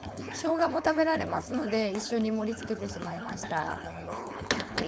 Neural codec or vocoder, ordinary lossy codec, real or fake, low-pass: codec, 16 kHz, 4.8 kbps, FACodec; none; fake; none